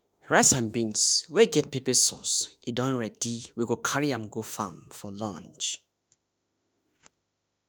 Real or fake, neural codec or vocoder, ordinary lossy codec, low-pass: fake; autoencoder, 48 kHz, 32 numbers a frame, DAC-VAE, trained on Japanese speech; none; none